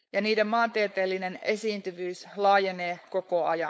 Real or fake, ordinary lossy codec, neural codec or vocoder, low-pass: fake; none; codec, 16 kHz, 4.8 kbps, FACodec; none